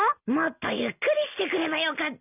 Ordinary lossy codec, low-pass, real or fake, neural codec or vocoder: none; 3.6 kHz; real; none